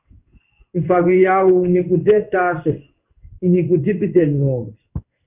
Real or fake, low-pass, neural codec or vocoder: fake; 3.6 kHz; codec, 16 kHz in and 24 kHz out, 1 kbps, XY-Tokenizer